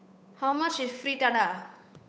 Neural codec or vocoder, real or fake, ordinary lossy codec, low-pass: codec, 16 kHz, 8 kbps, FunCodec, trained on Chinese and English, 25 frames a second; fake; none; none